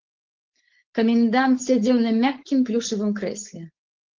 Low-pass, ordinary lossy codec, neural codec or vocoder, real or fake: 7.2 kHz; Opus, 16 kbps; codec, 16 kHz, 4.8 kbps, FACodec; fake